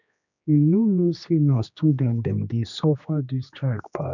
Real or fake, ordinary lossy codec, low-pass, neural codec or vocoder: fake; none; 7.2 kHz; codec, 16 kHz, 2 kbps, X-Codec, HuBERT features, trained on general audio